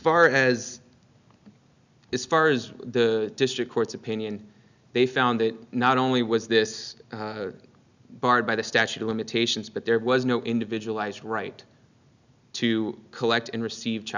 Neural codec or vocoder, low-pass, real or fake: none; 7.2 kHz; real